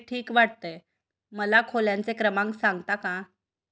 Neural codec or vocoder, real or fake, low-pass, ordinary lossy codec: none; real; none; none